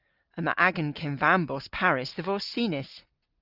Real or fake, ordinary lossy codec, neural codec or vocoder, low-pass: real; Opus, 24 kbps; none; 5.4 kHz